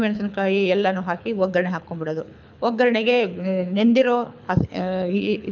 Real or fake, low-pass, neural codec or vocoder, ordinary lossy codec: fake; 7.2 kHz; codec, 24 kHz, 6 kbps, HILCodec; none